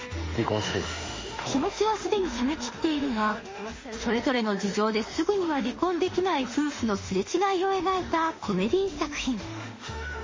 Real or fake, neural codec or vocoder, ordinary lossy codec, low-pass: fake; autoencoder, 48 kHz, 32 numbers a frame, DAC-VAE, trained on Japanese speech; MP3, 32 kbps; 7.2 kHz